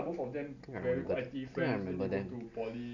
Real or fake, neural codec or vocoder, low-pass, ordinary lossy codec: real; none; 7.2 kHz; none